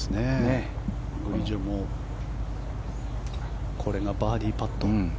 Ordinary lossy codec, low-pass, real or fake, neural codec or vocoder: none; none; real; none